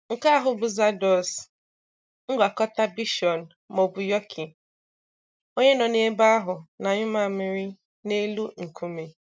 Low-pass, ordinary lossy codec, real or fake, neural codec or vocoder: none; none; real; none